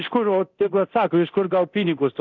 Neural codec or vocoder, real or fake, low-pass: codec, 24 kHz, 0.9 kbps, DualCodec; fake; 7.2 kHz